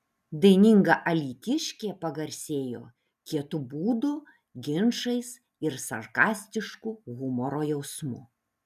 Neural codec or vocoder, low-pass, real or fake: none; 14.4 kHz; real